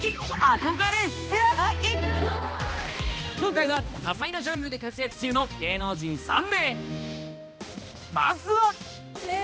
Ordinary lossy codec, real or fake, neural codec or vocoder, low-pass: none; fake; codec, 16 kHz, 1 kbps, X-Codec, HuBERT features, trained on balanced general audio; none